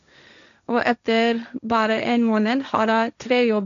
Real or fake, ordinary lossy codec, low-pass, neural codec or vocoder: fake; none; 7.2 kHz; codec, 16 kHz, 1.1 kbps, Voila-Tokenizer